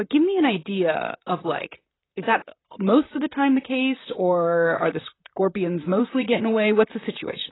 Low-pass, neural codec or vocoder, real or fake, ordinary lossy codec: 7.2 kHz; vocoder, 44.1 kHz, 128 mel bands, Pupu-Vocoder; fake; AAC, 16 kbps